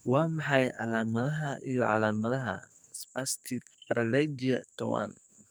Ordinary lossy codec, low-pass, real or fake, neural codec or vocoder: none; none; fake; codec, 44.1 kHz, 2.6 kbps, SNAC